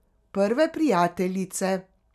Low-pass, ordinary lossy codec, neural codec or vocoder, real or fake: 14.4 kHz; none; none; real